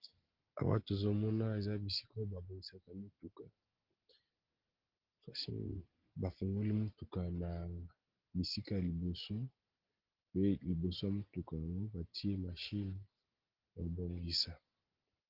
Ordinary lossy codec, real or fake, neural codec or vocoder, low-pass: Opus, 16 kbps; real; none; 5.4 kHz